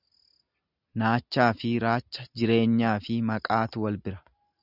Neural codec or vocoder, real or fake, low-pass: none; real; 5.4 kHz